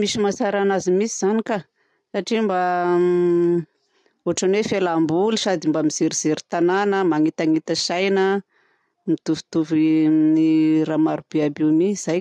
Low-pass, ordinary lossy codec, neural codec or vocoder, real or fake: none; none; none; real